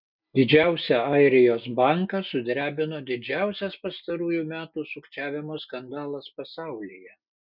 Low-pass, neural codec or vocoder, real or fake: 5.4 kHz; none; real